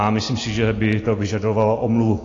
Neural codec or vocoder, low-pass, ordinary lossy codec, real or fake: none; 7.2 kHz; AAC, 32 kbps; real